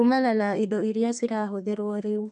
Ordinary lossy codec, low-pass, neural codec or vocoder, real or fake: none; 10.8 kHz; codec, 32 kHz, 1.9 kbps, SNAC; fake